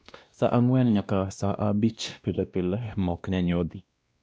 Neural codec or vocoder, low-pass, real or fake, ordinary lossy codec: codec, 16 kHz, 1 kbps, X-Codec, WavLM features, trained on Multilingual LibriSpeech; none; fake; none